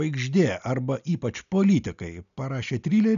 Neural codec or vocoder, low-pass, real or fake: none; 7.2 kHz; real